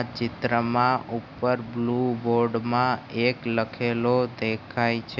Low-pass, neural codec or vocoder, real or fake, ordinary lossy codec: 7.2 kHz; none; real; none